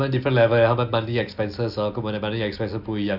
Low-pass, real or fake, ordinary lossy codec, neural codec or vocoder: 5.4 kHz; real; Opus, 64 kbps; none